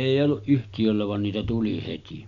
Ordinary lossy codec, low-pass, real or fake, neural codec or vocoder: none; 7.2 kHz; real; none